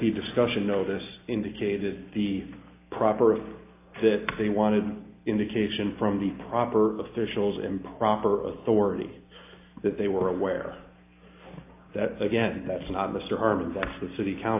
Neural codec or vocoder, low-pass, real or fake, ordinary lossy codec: none; 3.6 kHz; real; AAC, 24 kbps